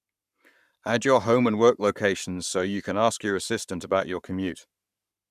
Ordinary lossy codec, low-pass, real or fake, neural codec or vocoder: none; 14.4 kHz; fake; codec, 44.1 kHz, 7.8 kbps, Pupu-Codec